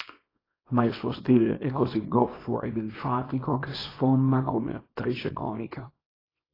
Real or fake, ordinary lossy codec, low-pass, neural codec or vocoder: fake; AAC, 24 kbps; 5.4 kHz; codec, 24 kHz, 0.9 kbps, WavTokenizer, small release